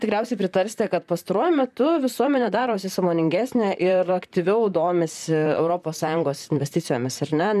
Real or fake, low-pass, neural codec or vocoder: fake; 14.4 kHz; vocoder, 44.1 kHz, 128 mel bands, Pupu-Vocoder